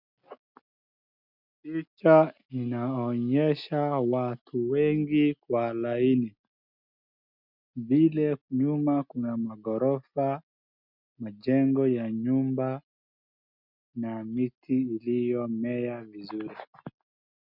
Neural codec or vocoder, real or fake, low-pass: none; real; 5.4 kHz